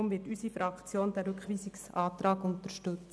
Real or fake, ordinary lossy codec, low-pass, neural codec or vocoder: real; none; none; none